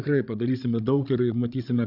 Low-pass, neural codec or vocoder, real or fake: 5.4 kHz; codec, 16 kHz, 16 kbps, FreqCodec, larger model; fake